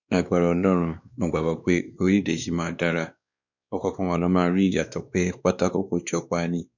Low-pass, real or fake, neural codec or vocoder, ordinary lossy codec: 7.2 kHz; fake; codec, 16 kHz, 2 kbps, X-Codec, WavLM features, trained on Multilingual LibriSpeech; none